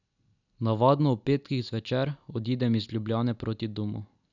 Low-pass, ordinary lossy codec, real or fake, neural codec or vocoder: 7.2 kHz; none; real; none